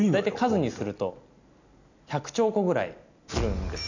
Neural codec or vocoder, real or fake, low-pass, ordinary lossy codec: none; real; 7.2 kHz; none